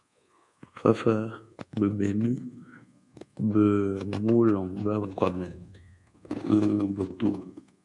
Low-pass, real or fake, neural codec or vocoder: 10.8 kHz; fake; codec, 24 kHz, 1.2 kbps, DualCodec